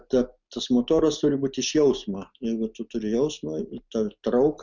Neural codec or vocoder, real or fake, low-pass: none; real; 7.2 kHz